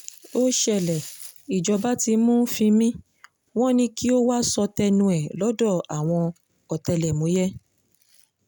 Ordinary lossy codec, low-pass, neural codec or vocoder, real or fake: none; none; none; real